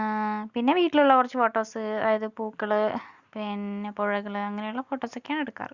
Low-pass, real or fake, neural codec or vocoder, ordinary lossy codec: 7.2 kHz; real; none; Opus, 64 kbps